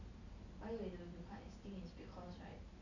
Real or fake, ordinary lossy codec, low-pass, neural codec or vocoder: real; none; 7.2 kHz; none